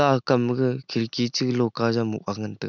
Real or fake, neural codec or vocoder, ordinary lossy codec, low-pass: real; none; none; 7.2 kHz